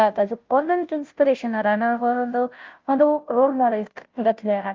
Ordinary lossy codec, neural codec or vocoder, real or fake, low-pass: Opus, 24 kbps; codec, 16 kHz, 0.5 kbps, FunCodec, trained on Chinese and English, 25 frames a second; fake; 7.2 kHz